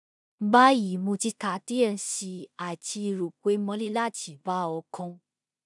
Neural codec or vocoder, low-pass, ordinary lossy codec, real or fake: codec, 16 kHz in and 24 kHz out, 0.4 kbps, LongCat-Audio-Codec, two codebook decoder; 10.8 kHz; none; fake